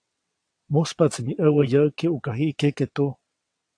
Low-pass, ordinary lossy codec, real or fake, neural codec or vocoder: 9.9 kHz; AAC, 48 kbps; fake; vocoder, 22.05 kHz, 80 mel bands, WaveNeXt